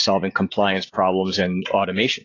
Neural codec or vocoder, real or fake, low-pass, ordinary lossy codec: none; real; 7.2 kHz; AAC, 32 kbps